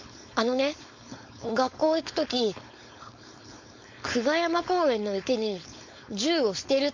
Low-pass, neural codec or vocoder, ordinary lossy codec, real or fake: 7.2 kHz; codec, 16 kHz, 4.8 kbps, FACodec; MP3, 48 kbps; fake